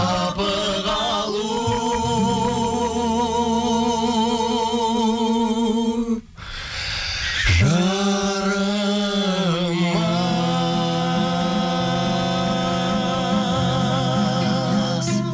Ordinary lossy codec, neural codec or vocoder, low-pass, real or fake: none; none; none; real